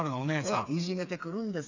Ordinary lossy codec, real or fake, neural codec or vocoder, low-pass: none; fake; codec, 16 kHz, 4 kbps, FreqCodec, smaller model; 7.2 kHz